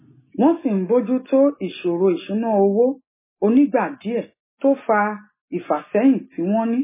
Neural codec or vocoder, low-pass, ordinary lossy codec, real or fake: none; 3.6 kHz; MP3, 16 kbps; real